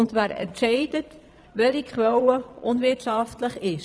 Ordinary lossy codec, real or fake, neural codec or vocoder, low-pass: none; fake; vocoder, 22.05 kHz, 80 mel bands, Vocos; none